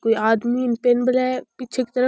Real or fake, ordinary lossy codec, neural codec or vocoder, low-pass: real; none; none; none